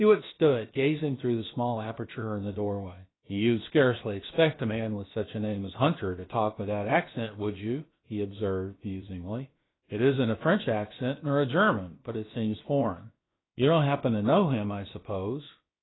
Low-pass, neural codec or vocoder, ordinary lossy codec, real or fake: 7.2 kHz; codec, 16 kHz, about 1 kbps, DyCAST, with the encoder's durations; AAC, 16 kbps; fake